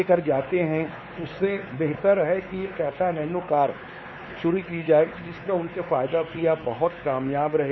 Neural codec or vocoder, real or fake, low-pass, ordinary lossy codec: codec, 16 kHz, 4 kbps, X-Codec, WavLM features, trained on Multilingual LibriSpeech; fake; 7.2 kHz; MP3, 24 kbps